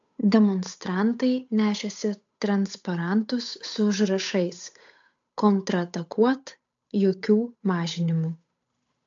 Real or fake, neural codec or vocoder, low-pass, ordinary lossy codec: fake; codec, 16 kHz, 8 kbps, FunCodec, trained on Chinese and English, 25 frames a second; 7.2 kHz; AAC, 64 kbps